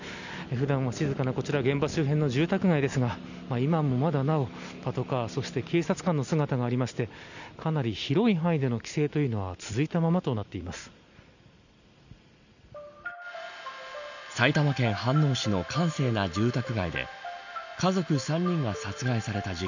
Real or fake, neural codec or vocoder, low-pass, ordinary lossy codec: real; none; 7.2 kHz; none